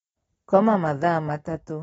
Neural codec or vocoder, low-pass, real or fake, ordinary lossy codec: none; 19.8 kHz; real; AAC, 24 kbps